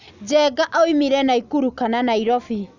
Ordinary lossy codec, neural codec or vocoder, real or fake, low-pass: none; none; real; 7.2 kHz